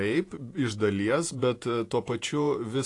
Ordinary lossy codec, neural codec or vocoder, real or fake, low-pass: AAC, 48 kbps; vocoder, 48 kHz, 128 mel bands, Vocos; fake; 10.8 kHz